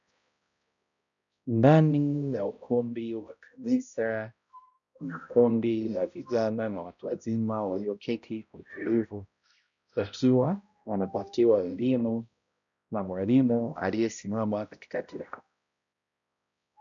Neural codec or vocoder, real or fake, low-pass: codec, 16 kHz, 0.5 kbps, X-Codec, HuBERT features, trained on balanced general audio; fake; 7.2 kHz